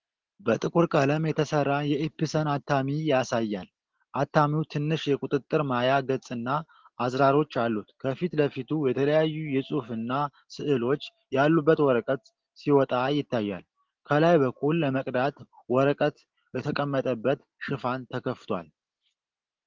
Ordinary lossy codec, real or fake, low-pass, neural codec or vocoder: Opus, 16 kbps; real; 7.2 kHz; none